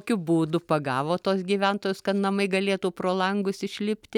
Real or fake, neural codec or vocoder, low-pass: real; none; 19.8 kHz